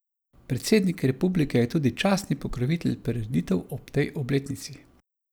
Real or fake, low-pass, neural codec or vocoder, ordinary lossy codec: real; none; none; none